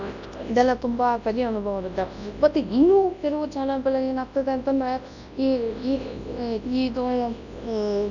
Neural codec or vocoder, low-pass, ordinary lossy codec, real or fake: codec, 24 kHz, 0.9 kbps, WavTokenizer, large speech release; 7.2 kHz; none; fake